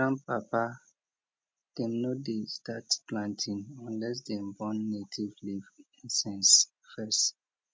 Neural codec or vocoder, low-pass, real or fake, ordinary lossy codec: none; none; real; none